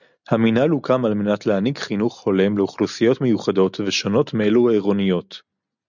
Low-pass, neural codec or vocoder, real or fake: 7.2 kHz; none; real